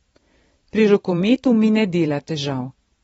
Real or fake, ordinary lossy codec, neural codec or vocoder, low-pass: fake; AAC, 24 kbps; vocoder, 44.1 kHz, 128 mel bands every 512 samples, BigVGAN v2; 19.8 kHz